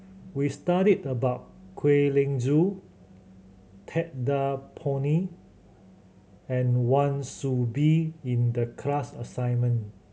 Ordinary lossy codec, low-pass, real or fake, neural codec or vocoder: none; none; real; none